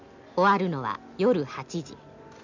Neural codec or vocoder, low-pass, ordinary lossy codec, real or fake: none; 7.2 kHz; none; real